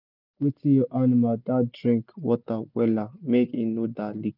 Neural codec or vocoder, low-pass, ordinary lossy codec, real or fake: none; 5.4 kHz; none; real